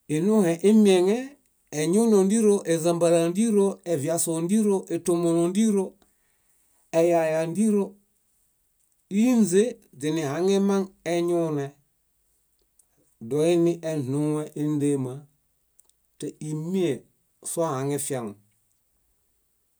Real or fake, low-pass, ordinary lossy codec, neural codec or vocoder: real; none; none; none